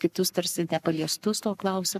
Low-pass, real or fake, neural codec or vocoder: 14.4 kHz; fake; codec, 44.1 kHz, 7.8 kbps, Pupu-Codec